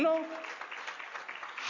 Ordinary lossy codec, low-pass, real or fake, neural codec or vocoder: MP3, 64 kbps; 7.2 kHz; real; none